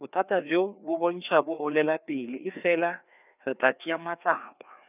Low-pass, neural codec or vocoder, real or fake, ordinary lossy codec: 3.6 kHz; codec, 16 kHz, 2 kbps, FreqCodec, larger model; fake; none